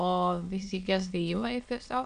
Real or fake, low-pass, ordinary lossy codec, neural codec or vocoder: fake; 9.9 kHz; AAC, 96 kbps; autoencoder, 22.05 kHz, a latent of 192 numbers a frame, VITS, trained on many speakers